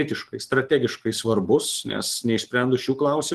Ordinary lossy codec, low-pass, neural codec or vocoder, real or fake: Opus, 16 kbps; 14.4 kHz; none; real